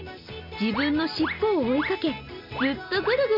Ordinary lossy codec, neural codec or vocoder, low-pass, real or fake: AAC, 48 kbps; none; 5.4 kHz; real